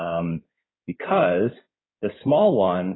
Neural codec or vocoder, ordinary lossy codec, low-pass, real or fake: codec, 16 kHz in and 24 kHz out, 2.2 kbps, FireRedTTS-2 codec; AAC, 16 kbps; 7.2 kHz; fake